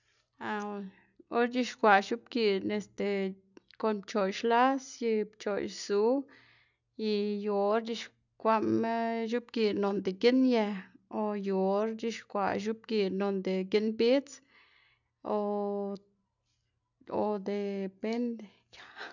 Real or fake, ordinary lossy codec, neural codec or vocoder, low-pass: real; none; none; 7.2 kHz